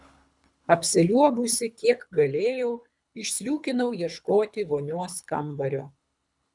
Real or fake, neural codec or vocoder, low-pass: fake; codec, 24 kHz, 3 kbps, HILCodec; 10.8 kHz